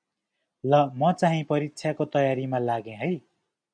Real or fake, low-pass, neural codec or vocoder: real; 9.9 kHz; none